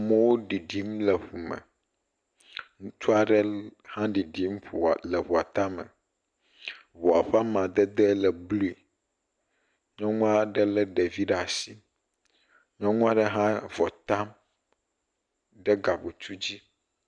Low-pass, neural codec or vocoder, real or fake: 9.9 kHz; none; real